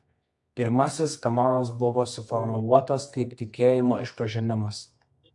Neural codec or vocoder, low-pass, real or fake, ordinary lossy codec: codec, 24 kHz, 0.9 kbps, WavTokenizer, medium music audio release; 10.8 kHz; fake; AAC, 64 kbps